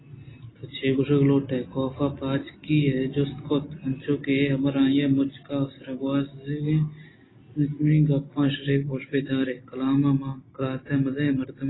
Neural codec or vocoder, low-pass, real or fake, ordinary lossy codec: none; 7.2 kHz; real; AAC, 16 kbps